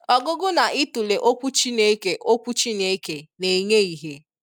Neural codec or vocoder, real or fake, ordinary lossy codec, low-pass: none; real; none; 19.8 kHz